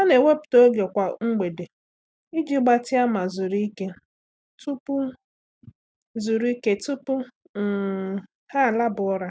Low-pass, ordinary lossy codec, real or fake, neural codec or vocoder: none; none; real; none